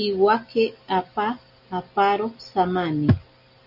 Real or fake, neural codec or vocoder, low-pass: real; none; 5.4 kHz